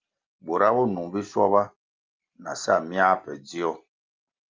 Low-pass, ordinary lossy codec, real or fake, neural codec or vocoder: 7.2 kHz; Opus, 24 kbps; real; none